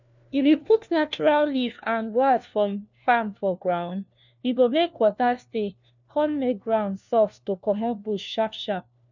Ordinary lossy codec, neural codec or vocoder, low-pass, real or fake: none; codec, 16 kHz, 1 kbps, FunCodec, trained on LibriTTS, 50 frames a second; 7.2 kHz; fake